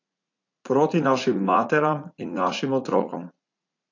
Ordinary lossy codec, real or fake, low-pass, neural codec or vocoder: AAC, 48 kbps; fake; 7.2 kHz; vocoder, 44.1 kHz, 80 mel bands, Vocos